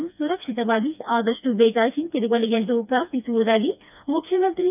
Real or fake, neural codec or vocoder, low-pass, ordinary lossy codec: fake; codec, 16 kHz, 2 kbps, FreqCodec, smaller model; 3.6 kHz; none